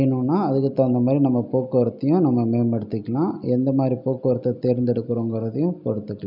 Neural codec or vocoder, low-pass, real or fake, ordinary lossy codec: none; 5.4 kHz; real; none